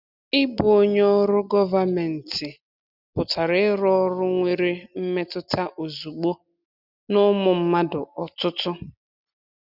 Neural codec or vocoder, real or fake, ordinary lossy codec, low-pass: none; real; none; 5.4 kHz